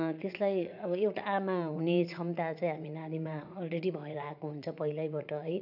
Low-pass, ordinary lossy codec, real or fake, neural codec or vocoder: 5.4 kHz; none; fake; vocoder, 44.1 kHz, 80 mel bands, Vocos